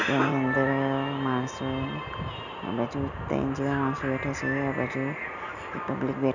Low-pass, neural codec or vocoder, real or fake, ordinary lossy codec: 7.2 kHz; none; real; none